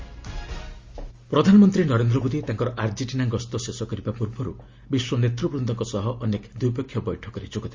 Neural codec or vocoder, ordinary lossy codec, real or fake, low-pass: none; Opus, 32 kbps; real; 7.2 kHz